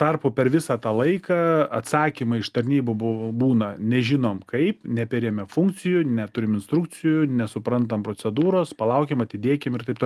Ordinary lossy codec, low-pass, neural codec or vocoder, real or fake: Opus, 32 kbps; 14.4 kHz; none; real